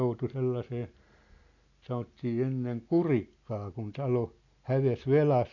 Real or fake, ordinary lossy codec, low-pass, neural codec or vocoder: real; none; 7.2 kHz; none